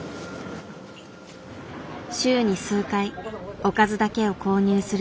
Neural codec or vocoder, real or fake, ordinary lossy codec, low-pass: none; real; none; none